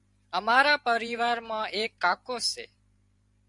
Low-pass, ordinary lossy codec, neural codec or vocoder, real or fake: 10.8 kHz; Opus, 64 kbps; vocoder, 44.1 kHz, 128 mel bands every 512 samples, BigVGAN v2; fake